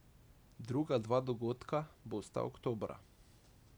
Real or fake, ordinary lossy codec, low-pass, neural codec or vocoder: real; none; none; none